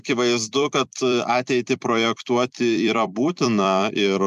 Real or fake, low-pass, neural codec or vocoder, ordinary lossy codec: fake; 14.4 kHz; vocoder, 44.1 kHz, 128 mel bands every 256 samples, BigVGAN v2; MP3, 96 kbps